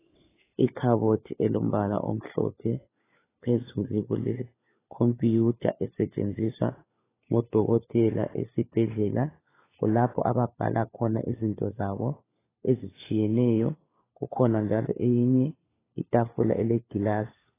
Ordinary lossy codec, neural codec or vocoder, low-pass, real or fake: AAC, 16 kbps; codec, 16 kHz, 4 kbps, FunCodec, trained on LibriTTS, 50 frames a second; 3.6 kHz; fake